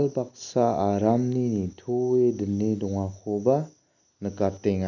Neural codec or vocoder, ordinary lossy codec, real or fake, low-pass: none; none; real; 7.2 kHz